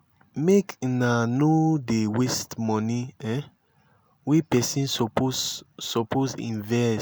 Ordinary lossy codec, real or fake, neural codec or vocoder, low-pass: none; real; none; none